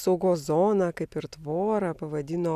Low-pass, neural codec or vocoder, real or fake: 14.4 kHz; none; real